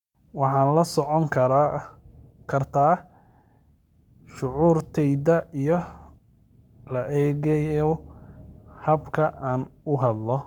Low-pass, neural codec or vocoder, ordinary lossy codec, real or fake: 19.8 kHz; codec, 44.1 kHz, 7.8 kbps, Pupu-Codec; none; fake